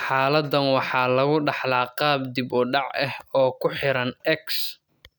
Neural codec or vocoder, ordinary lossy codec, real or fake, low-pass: none; none; real; none